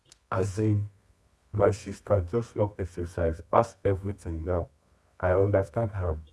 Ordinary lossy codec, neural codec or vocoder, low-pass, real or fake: none; codec, 24 kHz, 0.9 kbps, WavTokenizer, medium music audio release; none; fake